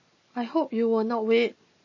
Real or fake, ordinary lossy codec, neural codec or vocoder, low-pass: real; MP3, 32 kbps; none; 7.2 kHz